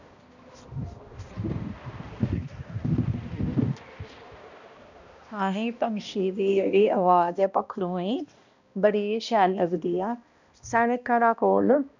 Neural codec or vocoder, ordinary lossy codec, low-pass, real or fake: codec, 16 kHz, 1 kbps, X-Codec, HuBERT features, trained on balanced general audio; none; 7.2 kHz; fake